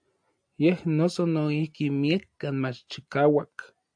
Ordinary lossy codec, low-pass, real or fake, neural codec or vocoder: AAC, 64 kbps; 9.9 kHz; real; none